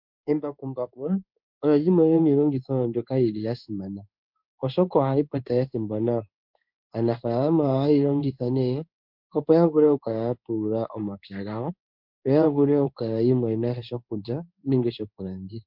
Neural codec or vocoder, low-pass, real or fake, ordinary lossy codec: codec, 16 kHz in and 24 kHz out, 1 kbps, XY-Tokenizer; 5.4 kHz; fake; MP3, 48 kbps